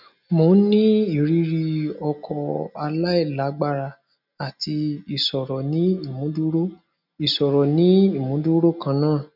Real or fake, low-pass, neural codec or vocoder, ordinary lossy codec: real; 5.4 kHz; none; none